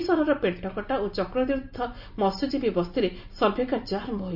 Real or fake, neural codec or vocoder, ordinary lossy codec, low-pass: real; none; none; 5.4 kHz